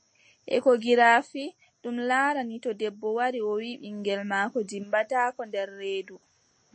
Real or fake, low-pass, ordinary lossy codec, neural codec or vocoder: real; 9.9 kHz; MP3, 32 kbps; none